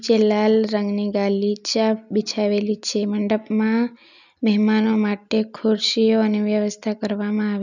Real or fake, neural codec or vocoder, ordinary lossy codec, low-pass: real; none; none; 7.2 kHz